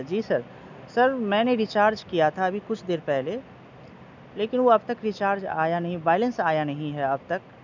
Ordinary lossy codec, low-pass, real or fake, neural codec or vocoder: none; 7.2 kHz; real; none